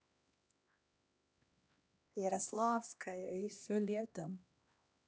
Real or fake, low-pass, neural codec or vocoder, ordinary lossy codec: fake; none; codec, 16 kHz, 1 kbps, X-Codec, HuBERT features, trained on LibriSpeech; none